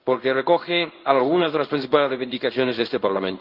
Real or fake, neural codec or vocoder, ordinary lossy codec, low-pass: fake; codec, 16 kHz in and 24 kHz out, 1 kbps, XY-Tokenizer; Opus, 24 kbps; 5.4 kHz